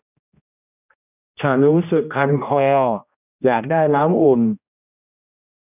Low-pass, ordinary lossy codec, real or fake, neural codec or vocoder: 3.6 kHz; none; fake; codec, 16 kHz, 1 kbps, X-Codec, HuBERT features, trained on general audio